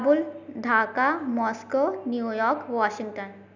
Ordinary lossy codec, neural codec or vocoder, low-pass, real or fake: none; none; 7.2 kHz; real